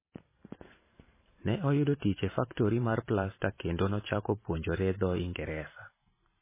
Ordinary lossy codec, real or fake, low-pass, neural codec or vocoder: MP3, 16 kbps; fake; 3.6 kHz; vocoder, 44.1 kHz, 128 mel bands every 512 samples, BigVGAN v2